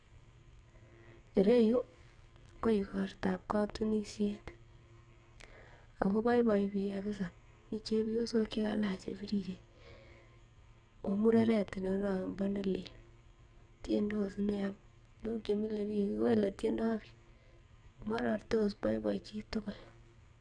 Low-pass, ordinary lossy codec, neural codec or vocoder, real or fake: 9.9 kHz; none; codec, 44.1 kHz, 2.6 kbps, SNAC; fake